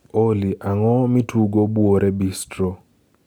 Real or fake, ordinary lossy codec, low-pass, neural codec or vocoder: real; none; none; none